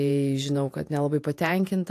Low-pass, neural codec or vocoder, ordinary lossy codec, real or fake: 14.4 kHz; vocoder, 48 kHz, 128 mel bands, Vocos; AAC, 64 kbps; fake